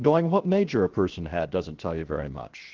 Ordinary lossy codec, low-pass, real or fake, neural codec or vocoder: Opus, 16 kbps; 7.2 kHz; fake; codec, 16 kHz, 0.7 kbps, FocalCodec